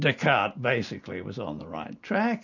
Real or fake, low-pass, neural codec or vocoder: real; 7.2 kHz; none